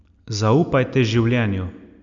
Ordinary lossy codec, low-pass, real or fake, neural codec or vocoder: none; 7.2 kHz; real; none